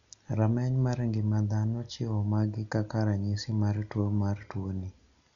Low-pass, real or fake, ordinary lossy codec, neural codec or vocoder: 7.2 kHz; real; none; none